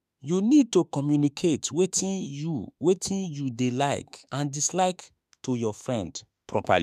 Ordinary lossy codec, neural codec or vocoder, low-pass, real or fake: none; autoencoder, 48 kHz, 32 numbers a frame, DAC-VAE, trained on Japanese speech; 14.4 kHz; fake